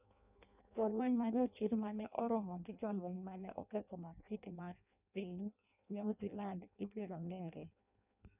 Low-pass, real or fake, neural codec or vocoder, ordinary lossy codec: 3.6 kHz; fake; codec, 16 kHz in and 24 kHz out, 0.6 kbps, FireRedTTS-2 codec; none